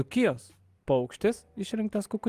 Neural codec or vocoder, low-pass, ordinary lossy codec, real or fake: none; 14.4 kHz; Opus, 24 kbps; real